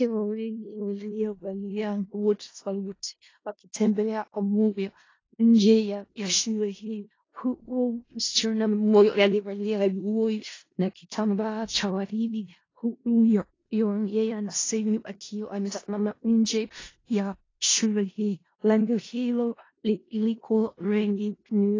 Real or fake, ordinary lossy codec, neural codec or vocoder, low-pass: fake; AAC, 32 kbps; codec, 16 kHz in and 24 kHz out, 0.4 kbps, LongCat-Audio-Codec, four codebook decoder; 7.2 kHz